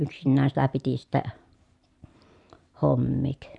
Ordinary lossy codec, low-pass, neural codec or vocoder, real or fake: none; 10.8 kHz; vocoder, 44.1 kHz, 128 mel bands every 512 samples, BigVGAN v2; fake